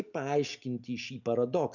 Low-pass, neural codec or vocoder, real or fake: 7.2 kHz; none; real